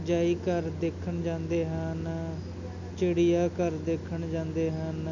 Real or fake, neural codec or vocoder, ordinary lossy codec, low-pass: real; none; none; 7.2 kHz